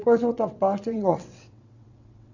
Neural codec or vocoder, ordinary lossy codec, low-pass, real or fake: vocoder, 22.05 kHz, 80 mel bands, WaveNeXt; none; 7.2 kHz; fake